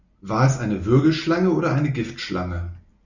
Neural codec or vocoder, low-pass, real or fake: none; 7.2 kHz; real